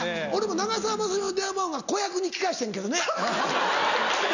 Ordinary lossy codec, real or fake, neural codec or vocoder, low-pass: none; real; none; 7.2 kHz